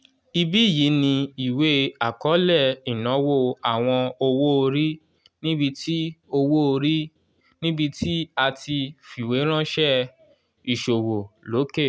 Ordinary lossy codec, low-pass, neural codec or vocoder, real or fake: none; none; none; real